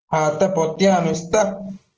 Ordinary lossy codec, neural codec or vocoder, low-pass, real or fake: Opus, 16 kbps; none; 7.2 kHz; real